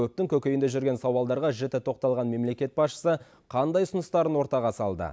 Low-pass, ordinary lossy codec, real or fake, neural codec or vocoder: none; none; real; none